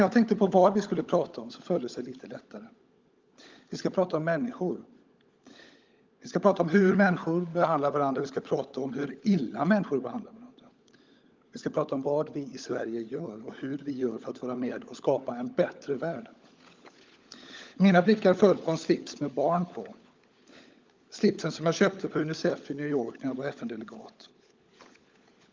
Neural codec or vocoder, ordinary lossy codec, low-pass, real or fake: codec, 16 kHz, 16 kbps, FunCodec, trained on LibriTTS, 50 frames a second; Opus, 24 kbps; 7.2 kHz; fake